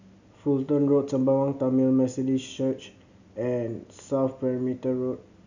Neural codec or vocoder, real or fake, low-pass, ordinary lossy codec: none; real; 7.2 kHz; none